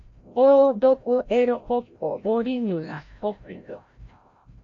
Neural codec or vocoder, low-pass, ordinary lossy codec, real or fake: codec, 16 kHz, 0.5 kbps, FreqCodec, larger model; 7.2 kHz; AAC, 48 kbps; fake